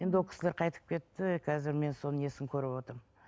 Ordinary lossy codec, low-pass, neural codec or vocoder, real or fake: none; none; none; real